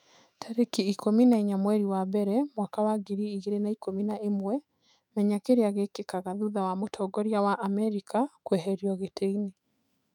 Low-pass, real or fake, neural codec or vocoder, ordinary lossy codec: 19.8 kHz; fake; autoencoder, 48 kHz, 128 numbers a frame, DAC-VAE, trained on Japanese speech; none